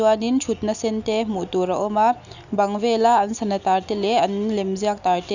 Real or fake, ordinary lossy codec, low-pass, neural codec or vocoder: real; none; 7.2 kHz; none